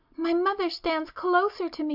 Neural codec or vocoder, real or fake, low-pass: none; real; 5.4 kHz